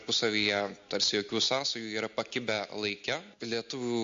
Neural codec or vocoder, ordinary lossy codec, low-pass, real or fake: none; MP3, 48 kbps; 7.2 kHz; real